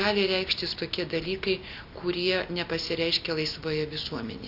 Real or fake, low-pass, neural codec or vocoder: real; 5.4 kHz; none